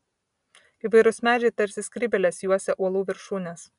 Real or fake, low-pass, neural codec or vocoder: real; 10.8 kHz; none